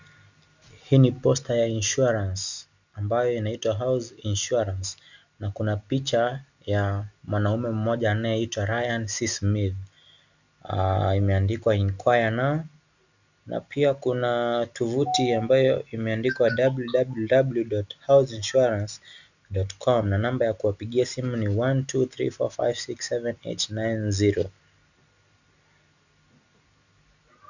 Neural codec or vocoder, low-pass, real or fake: none; 7.2 kHz; real